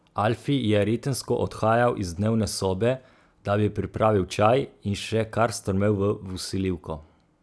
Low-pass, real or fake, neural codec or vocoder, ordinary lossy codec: none; real; none; none